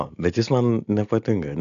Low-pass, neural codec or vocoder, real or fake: 7.2 kHz; none; real